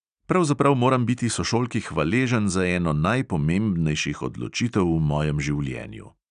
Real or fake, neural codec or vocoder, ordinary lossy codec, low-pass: real; none; none; 9.9 kHz